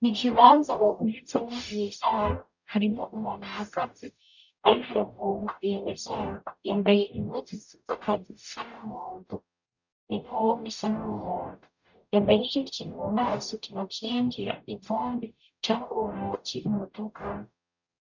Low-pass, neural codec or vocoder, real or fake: 7.2 kHz; codec, 44.1 kHz, 0.9 kbps, DAC; fake